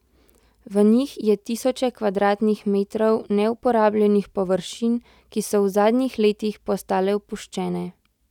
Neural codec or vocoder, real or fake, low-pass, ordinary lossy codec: none; real; 19.8 kHz; none